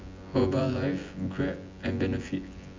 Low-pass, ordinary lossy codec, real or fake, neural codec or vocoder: 7.2 kHz; MP3, 64 kbps; fake; vocoder, 24 kHz, 100 mel bands, Vocos